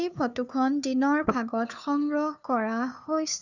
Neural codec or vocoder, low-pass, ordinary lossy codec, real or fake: codec, 16 kHz, 2 kbps, FunCodec, trained on Chinese and English, 25 frames a second; 7.2 kHz; none; fake